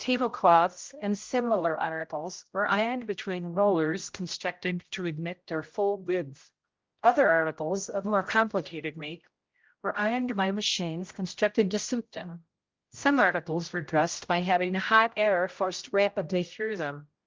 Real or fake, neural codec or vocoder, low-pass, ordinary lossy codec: fake; codec, 16 kHz, 0.5 kbps, X-Codec, HuBERT features, trained on general audio; 7.2 kHz; Opus, 32 kbps